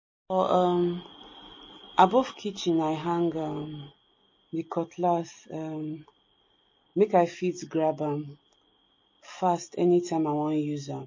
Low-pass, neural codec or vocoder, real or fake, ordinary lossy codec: 7.2 kHz; none; real; MP3, 32 kbps